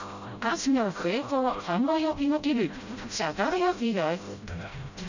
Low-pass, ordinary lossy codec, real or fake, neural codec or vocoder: 7.2 kHz; none; fake; codec, 16 kHz, 0.5 kbps, FreqCodec, smaller model